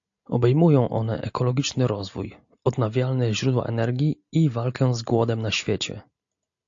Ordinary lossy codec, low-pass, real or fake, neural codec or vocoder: AAC, 64 kbps; 7.2 kHz; real; none